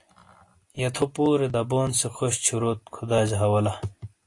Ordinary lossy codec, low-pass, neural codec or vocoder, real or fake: AAC, 48 kbps; 10.8 kHz; none; real